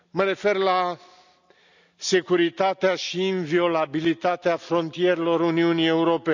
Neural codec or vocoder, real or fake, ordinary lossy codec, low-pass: none; real; none; 7.2 kHz